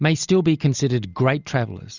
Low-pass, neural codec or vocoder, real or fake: 7.2 kHz; none; real